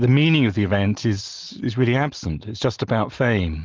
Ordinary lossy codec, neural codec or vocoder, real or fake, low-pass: Opus, 32 kbps; none; real; 7.2 kHz